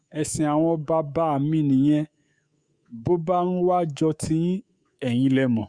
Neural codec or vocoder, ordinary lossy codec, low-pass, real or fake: codec, 24 kHz, 3.1 kbps, DualCodec; Opus, 64 kbps; 9.9 kHz; fake